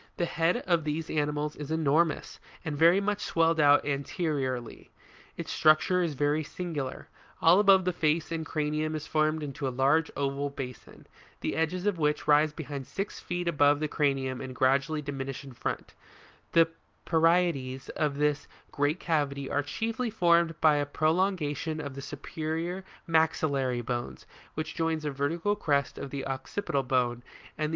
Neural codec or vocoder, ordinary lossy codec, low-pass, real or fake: none; Opus, 32 kbps; 7.2 kHz; real